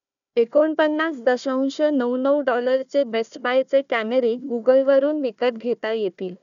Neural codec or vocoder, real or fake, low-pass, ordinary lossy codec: codec, 16 kHz, 1 kbps, FunCodec, trained on Chinese and English, 50 frames a second; fake; 7.2 kHz; none